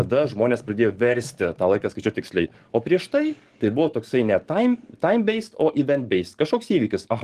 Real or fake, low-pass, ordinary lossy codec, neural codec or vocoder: fake; 14.4 kHz; Opus, 24 kbps; codec, 44.1 kHz, 7.8 kbps, DAC